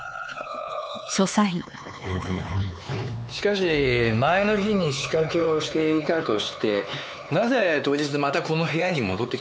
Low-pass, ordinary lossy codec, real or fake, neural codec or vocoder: none; none; fake; codec, 16 kHz, 4 kbps, X-Codec, HuBERT features, trained on LibriSpeech